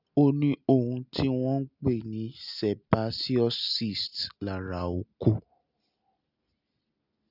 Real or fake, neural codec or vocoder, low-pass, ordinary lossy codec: real; none; 5.4 kHz; none